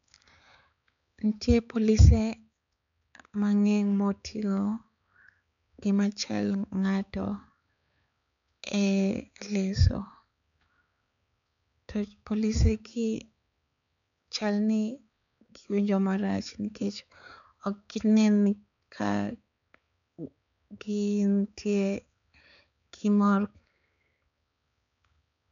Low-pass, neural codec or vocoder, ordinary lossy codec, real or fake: 7.2 kHz; codec, 16 kHz, 4 kbps, X-Codec, HuBERT features, trained on balanced general audio; none; fake